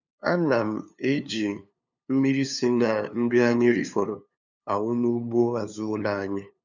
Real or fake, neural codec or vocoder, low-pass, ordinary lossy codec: fake; codec, 16 kHz, 2 kbps, FunCodec, trained on LibriTTS, 25 frames a second; 7.2 kHz; none